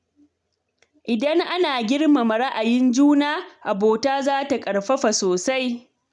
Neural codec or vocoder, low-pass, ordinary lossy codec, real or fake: none; 10.8 kHz; none; real